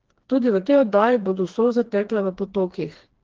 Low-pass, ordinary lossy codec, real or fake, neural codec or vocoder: 7.2 kHz; Opus, 16 kbps; fake; codec, 16 kHz, 2 kbps, FreqCodec, smaller model